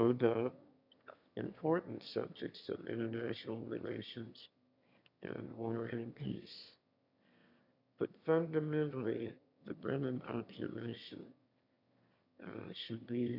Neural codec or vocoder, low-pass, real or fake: autoencoder, 22.05 kHz, a latent of 192 numbers a frame, VITS, trained on one speaker; 5.4 kHz; fake